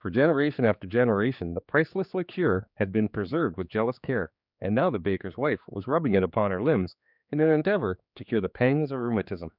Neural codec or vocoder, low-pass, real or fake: codec, 16 kHz, 4 kbps, X-Codec, HuBERT features, trained on general audio; 5.4 kHz; fake